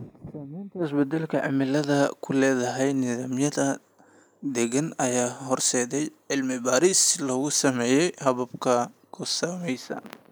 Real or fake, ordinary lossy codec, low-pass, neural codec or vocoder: real; none; none; none